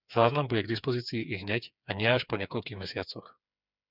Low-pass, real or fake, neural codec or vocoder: 5.4 kHz; fake; codec, 16 kHz, 4 kbps, FreqCodec, smaller model